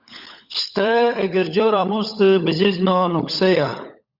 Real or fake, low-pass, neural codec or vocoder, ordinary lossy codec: fake; 5.4 kHz; codec, 16 kHz, 16 kbps, FunCodec, trained on LibriTTS, 50 frames a second; Opus, 64 kbps